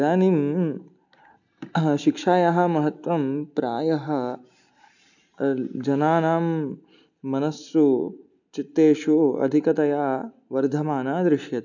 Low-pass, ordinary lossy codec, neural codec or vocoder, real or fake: 7.2 kHz; none; none; real